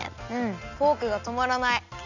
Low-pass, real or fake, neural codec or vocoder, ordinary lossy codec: 7.2 kHz; real; none; none